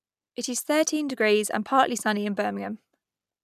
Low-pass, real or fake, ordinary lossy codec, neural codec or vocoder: 14.4 kHz; real; none; none